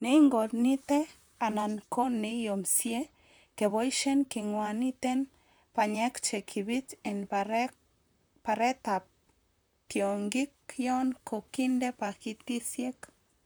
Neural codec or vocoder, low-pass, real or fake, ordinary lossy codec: vocoder, 44.1 kHz, 128 mel bands, Pupu-Vocoder; none; fake; none